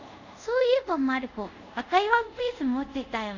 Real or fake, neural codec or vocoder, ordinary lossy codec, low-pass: fake; codec, 24 kHz, 0.5 kbps, DualCodec; none; 7.2 kHz